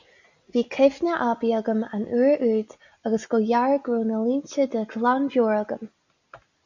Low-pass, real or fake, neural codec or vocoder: 7.2 kHz; real; none